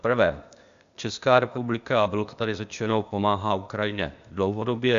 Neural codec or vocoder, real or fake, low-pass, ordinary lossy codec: codec, 16 kHz, 0.8 kbps, ZipCodec; fake; 7.2 kHz; Opus, 64 kbps